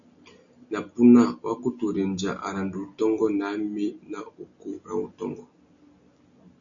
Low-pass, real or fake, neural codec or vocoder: 7.2 kHz; real; none